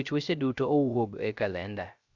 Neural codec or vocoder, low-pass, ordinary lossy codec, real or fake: codec, 16 kHz, 0.3 kbps, FocalCodec; 7.2 kHz; Opus, 64 kbps; fake